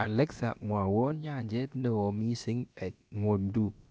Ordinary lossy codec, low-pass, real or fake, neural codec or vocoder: none; none; fake; codec, 16 kHz, about 1 kbps, DyCAST, with the encoder's durations